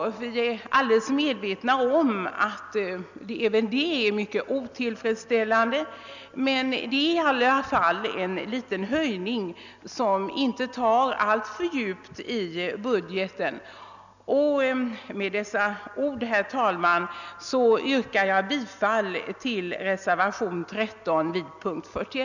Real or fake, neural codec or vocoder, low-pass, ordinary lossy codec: real; none; 7.2 kHz; none